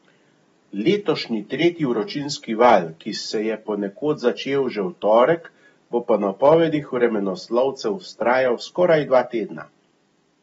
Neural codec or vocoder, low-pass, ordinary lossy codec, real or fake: none; 10.8 kHz; AAC, 24 kbps; real